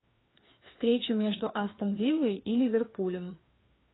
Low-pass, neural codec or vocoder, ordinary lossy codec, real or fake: 7.2 kHz; codec, 16 kHz, 2 kbps, FreqCodec, larger model; AAC, 16 kbps; fake